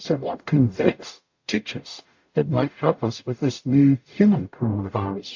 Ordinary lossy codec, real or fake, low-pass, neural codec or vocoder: AAC, 48 kbps; fake; 7.2 kHz; codec, 44.1 kHz, 0.9 kbps, DAC